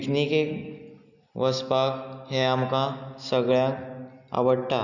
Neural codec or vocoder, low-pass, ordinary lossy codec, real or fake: none; 7.2 kHz; none; real